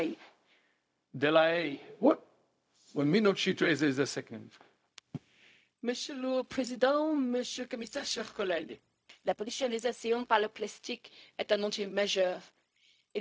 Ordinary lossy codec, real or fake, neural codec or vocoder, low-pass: none; fake; codec, 16 kHz, 0.4 kbps, LongCat-Audio-Codec; none